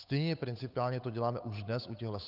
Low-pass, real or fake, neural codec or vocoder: 5.4 kHz; fake; codec, 16 kHz, 8 kbps, FunCodec, trained on Chinese and English, 25 frames a second